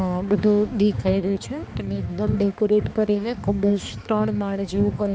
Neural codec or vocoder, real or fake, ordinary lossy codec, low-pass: codec, 16 kHz, 2 kbps, X-Codec, HuBERT features, trained on balanced general audio; fake; none; none